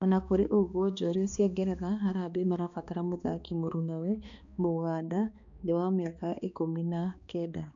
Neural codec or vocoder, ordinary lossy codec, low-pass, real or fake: codec, 16 kHz, 2 kbps, X-Codec, HuBERT features, trained on balanced general audio; none; 7.2 kHz; fake